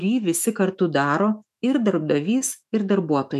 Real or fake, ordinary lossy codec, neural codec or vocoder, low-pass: fake; MP3, 96 kbps; autoencoder, 48 kHz, 128 numbers a frame, DAC-VAE, trained on Japanese speech; 14.4 kHz